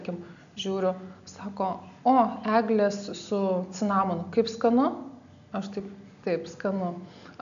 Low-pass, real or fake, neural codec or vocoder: 7.2 kHz; real; none